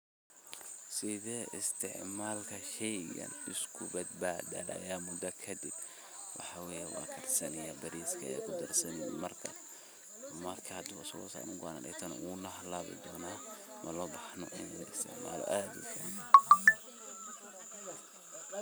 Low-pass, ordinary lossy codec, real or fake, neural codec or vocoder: none; none; real; none